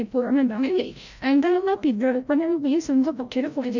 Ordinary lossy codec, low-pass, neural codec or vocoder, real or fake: none; 7.2 kHz; codec, 16 kHz, 0.5 kbps, FreqCodec, larger model; fake